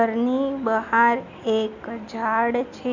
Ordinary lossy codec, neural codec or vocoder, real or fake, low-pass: none; none; real; 7.2 kHz